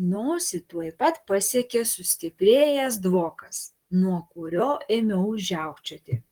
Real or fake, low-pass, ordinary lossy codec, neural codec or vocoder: fake; 19.8 kHz; Opus, 24 kbps; vocoder, 44.1 kHz, 128 mel bands, Pupu-Vocoder